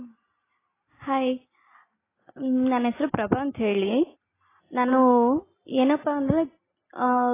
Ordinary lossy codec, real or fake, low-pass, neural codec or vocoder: AAC, 16 kbps; real; 3.6 kHz; none